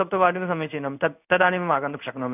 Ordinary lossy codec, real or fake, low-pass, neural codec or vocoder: none; fake; 3.6 kHz; codec, 16 kHz in and 24 kHz out, 1 kbps, XY-Tokenizer